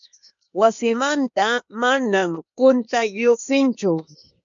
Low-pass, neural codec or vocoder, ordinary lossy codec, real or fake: 7.2 kHz; codec, 16 kHz, 0.8 kbps, ZipCodec; MP3, 64 kbps; fake